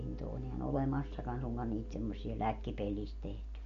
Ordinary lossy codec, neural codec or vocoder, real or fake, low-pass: MP3, 48 kbps; none; real; 7.2 kHz